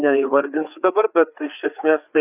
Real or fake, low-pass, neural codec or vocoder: fake; 3.6 kHz; codec, 16 kHz, 4 kbps, FreqCodec, larger model